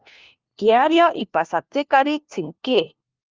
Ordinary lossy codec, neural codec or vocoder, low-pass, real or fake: Opus, 32 kbps; codec, 16 kHz, 1 kbps, FunCodec, trained on LibriTTS, 50 frames a second; 7.2 kHz; fake